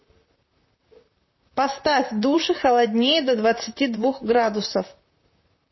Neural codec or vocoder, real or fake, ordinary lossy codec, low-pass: vocoder, 44.1 kHz, 128 mel bands, Pupu-Vocoder; fake; MP3, 24 kbps; 7.2 kHz